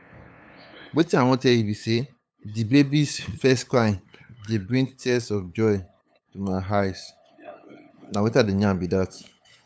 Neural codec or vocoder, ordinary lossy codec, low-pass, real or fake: codec, 16 kHz, 8 kbps, FunCodec, trained on LibriTTS, 25 frames a second; none; none; fake